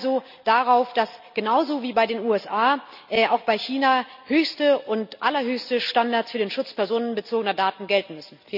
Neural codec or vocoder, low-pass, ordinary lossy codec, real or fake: none; 5.4 kHz; none; real